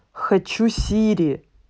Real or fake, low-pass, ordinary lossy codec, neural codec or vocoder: real; none; none; none